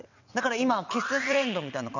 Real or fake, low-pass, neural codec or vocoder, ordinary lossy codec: fake; 7.2 kHz; vocoder, 22.05 kHz, 80 mel bands, WaveNeXt; none